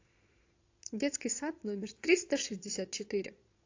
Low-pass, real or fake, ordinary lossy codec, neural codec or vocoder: 7.2 kHz; fake; AAC, 48 kbps; vocoder, 22.05 kHz, 80 mel bands, Vocos